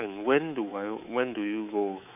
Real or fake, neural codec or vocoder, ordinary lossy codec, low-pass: fake; codec, 24 kHz, 3.1 kbps, DualCodec; none; 3.6 kHz